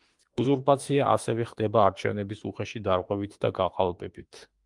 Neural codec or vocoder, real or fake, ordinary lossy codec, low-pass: autoencoder, 48 kHz, 32 numbers a frame, DAC-VAE, trained on Japanese speech; fake; Opus, 24 kbps; 10.8 kHz